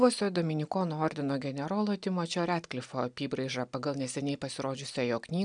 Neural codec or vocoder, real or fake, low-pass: none; real; 9.9 kHz